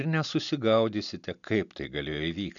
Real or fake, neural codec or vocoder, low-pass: real; none; 7.2 kHz